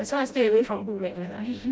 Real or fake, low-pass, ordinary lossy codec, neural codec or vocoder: fake; none; none; codec, 16 kHz, 0.5 kbps, FreqCodec, smaller model